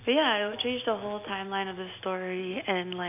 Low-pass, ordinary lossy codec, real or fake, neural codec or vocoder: 3.6 kHz; none; real; none